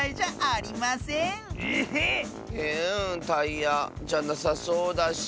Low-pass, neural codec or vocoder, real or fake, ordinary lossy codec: none; none; real; none